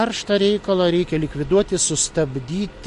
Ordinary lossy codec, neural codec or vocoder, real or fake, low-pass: MP3, 48 kbps; none; real; 14.4 kHz